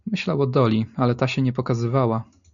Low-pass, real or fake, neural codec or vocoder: 7.2 kHz; real; none